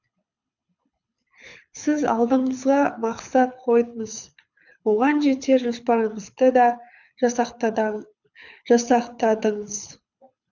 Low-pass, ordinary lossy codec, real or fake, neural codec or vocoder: 7.2 kHz; none; fake; codec, 24 kHz, 6 kbps, HILCodec